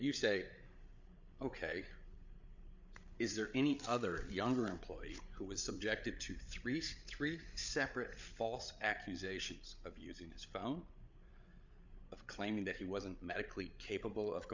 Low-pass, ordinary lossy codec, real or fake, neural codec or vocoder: 7.2 kHz; MP3, 64 kbps; fake; codec, 16 kHz, 8 kbps, FreqCodec, larger model